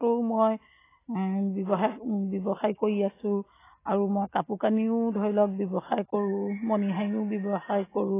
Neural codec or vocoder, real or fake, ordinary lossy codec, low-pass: none; real; AAC, 16 kbps; 3.6 kHz